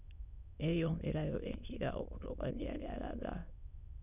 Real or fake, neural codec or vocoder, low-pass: fake; autoencoder, 22.05 kHz, a latent of 192 numbers a frame, VITS, trained on many speakers; 3.6 kHz